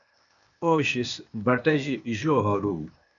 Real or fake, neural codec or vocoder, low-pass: fake; codec, 16 kHz, 0.8 kbps, ZipCodec; 7.2 kHz